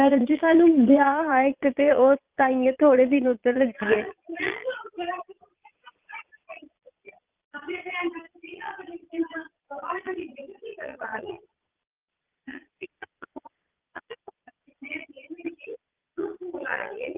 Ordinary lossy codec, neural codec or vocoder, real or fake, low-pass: Opus, 32 kbps; vocoder, 22.05 kHz, 80 mel bands, Vocos; fake; 3.6 kHz